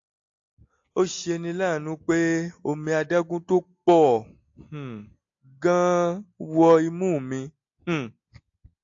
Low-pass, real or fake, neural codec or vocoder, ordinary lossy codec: 7.2 kHz; real; none; AAC, 48 kbps